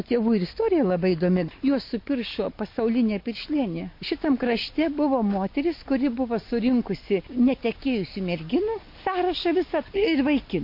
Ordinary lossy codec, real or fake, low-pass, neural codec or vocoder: MP3, 32 kbps; real; 5.4 kHz; none